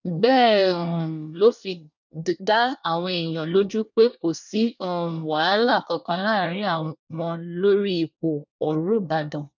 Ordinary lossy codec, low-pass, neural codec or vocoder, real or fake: none; 7.2 kHz; codec, 24 kHz, 1 kbps, SNAC; fake